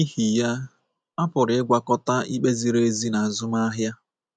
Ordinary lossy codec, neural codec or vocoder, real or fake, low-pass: none; none; real; none